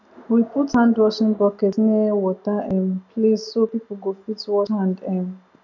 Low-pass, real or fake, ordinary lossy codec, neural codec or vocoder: 7.2 kHz; real; none; none